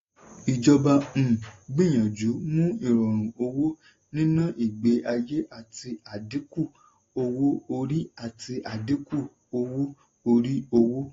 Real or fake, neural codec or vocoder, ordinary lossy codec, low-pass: real; none; AAC, 32 kbps; 7.2 kHz